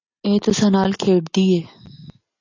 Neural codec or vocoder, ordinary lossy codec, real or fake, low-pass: none; AAC, 48 kbps; real; 7.2 kHz